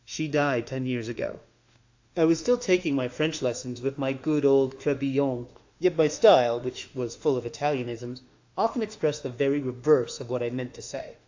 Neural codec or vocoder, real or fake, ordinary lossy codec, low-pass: autoencoder, 48 kHz, 32 numbers a frame, DAC-VAE, trained on Japanese speech; fake; AAC, 48 kbps; 7.2 kHz